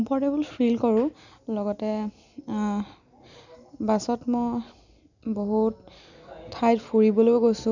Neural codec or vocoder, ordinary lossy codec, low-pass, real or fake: none; none; 7.2 kHz; real